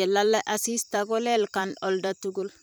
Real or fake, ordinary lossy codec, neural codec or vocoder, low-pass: fake; none; vocoder, 44.1 kHz, 128 mel bands every 512 samples, BigVGAN v2; none